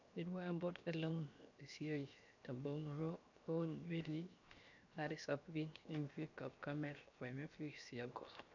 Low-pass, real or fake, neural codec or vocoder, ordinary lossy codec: 7.2 kHz; fake; codec, 16 kHz, 0.7 kbps, FocalCodec; none